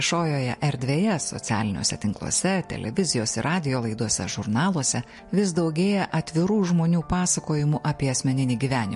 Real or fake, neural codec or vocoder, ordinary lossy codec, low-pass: real; none; MP3, 48 kbps; 14.4 kHz